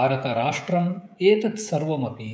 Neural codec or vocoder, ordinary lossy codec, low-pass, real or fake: codec, 16 kHz, 16 kbps, FreqCodec, smaller model; none; none; fake